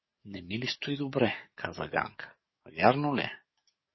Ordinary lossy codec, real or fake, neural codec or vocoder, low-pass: MP3, 24 kbps; fake; codec, 44.1 kHz, 7.8 kbps, DAC; 7.2 kHz